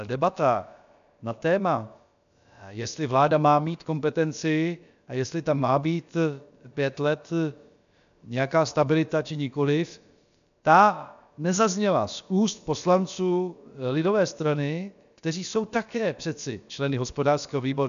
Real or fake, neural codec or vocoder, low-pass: fake; codec, 16 kHz, about 1 kbps, DyCAST, with the encoder's durations; 7.2 kHz